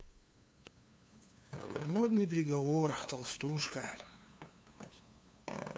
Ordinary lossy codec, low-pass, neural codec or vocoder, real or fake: none; none; codec, 16 kHz, 2 kbps, FunCodec, trained on LibriTTS, 25 frames a second; fake